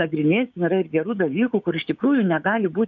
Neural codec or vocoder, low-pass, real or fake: codec, 16 kHz, 6 kbps, DAC; 7.2 kHz; fake